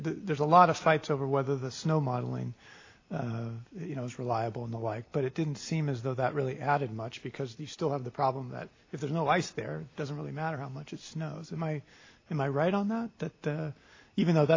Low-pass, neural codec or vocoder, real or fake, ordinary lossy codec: 7.2 kHz; none; real; AAC, 32 kbps